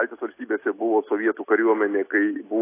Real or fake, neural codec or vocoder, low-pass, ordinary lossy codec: real; none; 3.6 kHz; AAC, 24 kbps